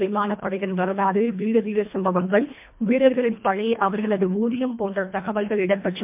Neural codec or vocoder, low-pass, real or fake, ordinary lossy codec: codec, 24 kHz, 1.5 kbps, HILCodec; 3.6 kHz; fake; MP3, 32 kbps